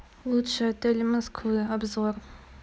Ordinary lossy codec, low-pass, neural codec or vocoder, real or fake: none; none; none; real